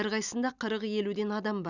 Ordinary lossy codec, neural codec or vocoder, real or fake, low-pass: none; none; real; 7.2 kHz